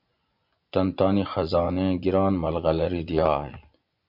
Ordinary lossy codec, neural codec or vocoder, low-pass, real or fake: MP3, 48 kbps; vocoder, 24 kHz, 100 mel bands, Vocos; 5.4 kHz; fake